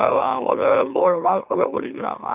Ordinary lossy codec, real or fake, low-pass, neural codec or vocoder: none; fake; 3.6 kHz; autoencoder, 44.1 kHz, a latent of 192 numbers a frame, MeloTTS